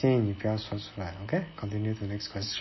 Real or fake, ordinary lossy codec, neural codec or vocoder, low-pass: real; MP3, 24 kbps; none; 7.2 kHz